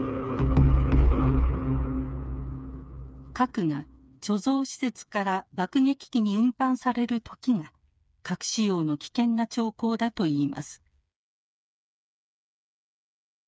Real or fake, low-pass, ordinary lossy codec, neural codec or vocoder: fake; none; none; codec, 16 kHz, 4 kbps, FreqCodec, smaller model